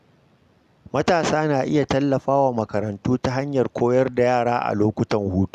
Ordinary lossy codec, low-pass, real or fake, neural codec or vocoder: Opus, 64 kbps; 14.4 kHz; real; none